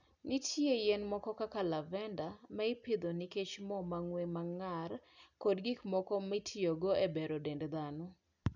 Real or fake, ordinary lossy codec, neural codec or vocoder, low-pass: real; none; none; 7.2 kHz